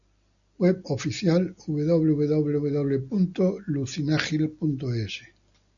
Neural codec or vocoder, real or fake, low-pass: none; real; 7.2 kHz